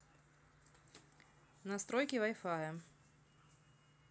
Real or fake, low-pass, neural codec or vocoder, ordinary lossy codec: real; none; none; none